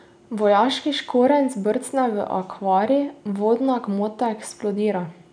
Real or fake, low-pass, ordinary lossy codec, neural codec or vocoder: real; 9.9 kHz; none; none